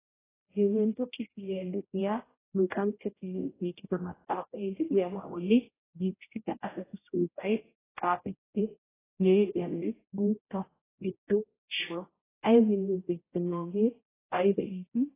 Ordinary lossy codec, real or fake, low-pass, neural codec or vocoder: AAC, 16 kbps; fake; 3.6 kHz; codec, 16 kHz, 0.5 kbps, X-Codec, HuBERT features, trained on general audio